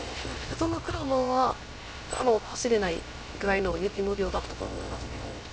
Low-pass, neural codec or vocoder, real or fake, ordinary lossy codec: none; codec, 16 kHz, 0.3 kbps, FocalCodec; fake; none